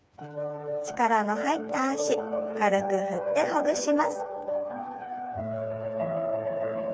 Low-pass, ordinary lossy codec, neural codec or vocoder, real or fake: none; none; codec, 16 kHz, 4 kbps, FreqCodec, smaller model; fake